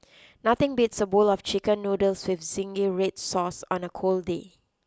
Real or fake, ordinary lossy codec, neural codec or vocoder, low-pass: real; none; none; none